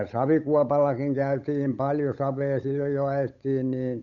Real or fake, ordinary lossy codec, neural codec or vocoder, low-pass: fake; none; codec, 16 kHz, 8 kbps, FunCodec, trained on Chinese and English, 25 frames a second; 7.2 kHz